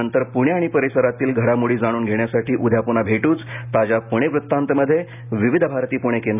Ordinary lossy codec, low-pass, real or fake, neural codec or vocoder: none; 3.6 kHz; real; none